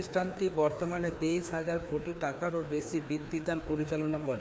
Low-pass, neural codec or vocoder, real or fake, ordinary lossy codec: none; codec, 16 kHz, 2 kbps, FreqCodec, larger model; fake; none